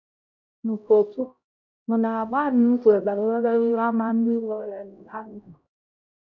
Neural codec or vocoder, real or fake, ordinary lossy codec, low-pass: codec, 16 kHz, 0.5 kbps, X-Codec, HuBERT features, trained on LibriSpeech; fake; none; 7.2 kHz